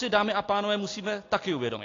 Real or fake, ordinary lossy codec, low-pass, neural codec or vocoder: real; AAC, 32 kbps; 7.2 kHz; none